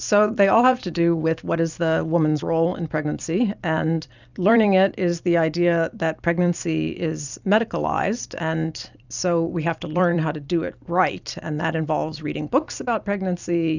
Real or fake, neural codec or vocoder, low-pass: fake; vocoder, 44.1 kHz, 128 mel bands every 256 samples, BigVGAN v2; 7.2 kHz